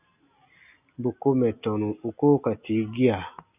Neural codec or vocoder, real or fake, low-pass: none; real; 3.6 kHz